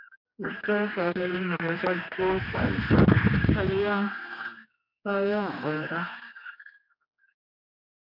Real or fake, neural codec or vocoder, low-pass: fake; codec, 16 kHz, 2 kbps, X-Codec, HuBERT features, trained on general audio; 5.4 kHz